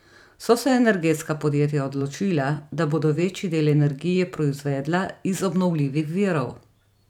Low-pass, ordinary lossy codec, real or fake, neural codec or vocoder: 19.8 kHz; none; fake; vocoder, 44.1 kHz, 128 mel bands every 512 samples, BigVGAN v2